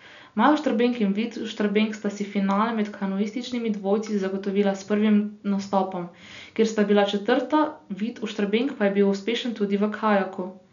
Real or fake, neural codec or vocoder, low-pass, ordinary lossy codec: real; none; 7.2 kHz; none